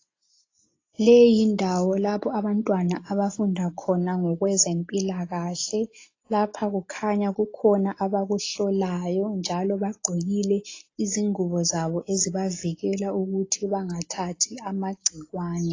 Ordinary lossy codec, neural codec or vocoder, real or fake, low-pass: AAC, 32 kbps; none; real; 7.2 kHz